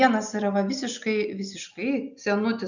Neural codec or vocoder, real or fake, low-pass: none; real; 7.2 kHz